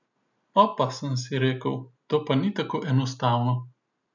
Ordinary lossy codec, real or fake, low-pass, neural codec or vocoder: none; real; 7.2 kHz; none